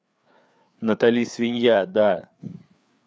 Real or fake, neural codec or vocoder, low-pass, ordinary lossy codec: fake; codec, 16 kHz, 4 kbps, FreqCodec, larger model; none; none